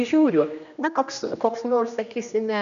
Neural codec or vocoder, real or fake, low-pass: codec, 16 kHz, 1 kbps, X-Codec, HuBERT features, trained on general audio; fake; 7.2 kHz